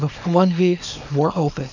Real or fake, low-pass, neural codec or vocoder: fake; 7.2 kHz; codec, 24 kHz, 0.9 kbps, WavTokenizer, small release